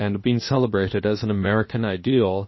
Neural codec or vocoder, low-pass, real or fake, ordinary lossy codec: codec, 16 kHz, 0.7 kbps, FocalCodec; 7.2 kHz; fake; MP3, 24 kbps